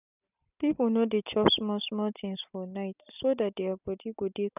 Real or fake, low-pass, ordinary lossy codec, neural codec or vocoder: real; 3.6 kHz; none; none